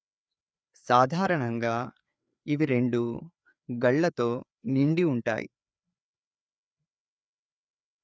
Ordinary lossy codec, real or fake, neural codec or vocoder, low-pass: none; fake; codec, 16 kHz, 4 kbps, FreqCodec, larger model; none